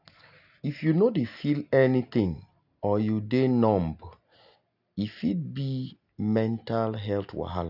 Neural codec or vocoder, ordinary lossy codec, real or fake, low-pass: none; none; real; 5.4 kHz